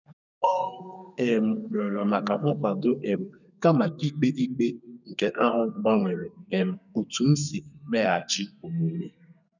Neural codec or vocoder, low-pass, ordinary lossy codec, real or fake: codec, 32 kHz, 1.9 kbps, SNAC; 7.2 kHz; none; fake